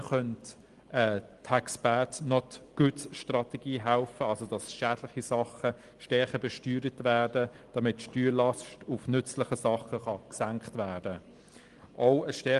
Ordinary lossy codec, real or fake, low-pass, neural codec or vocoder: Opus, 24 kbps; real; 10.8 kHz; none